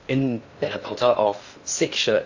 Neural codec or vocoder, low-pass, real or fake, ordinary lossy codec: codec, 16 kHz in and 24 kHz out, 0.6 kbps, FocalCodec, streaming, 4096 codes; 7.2 kHz; fake; AAC, 48 kbps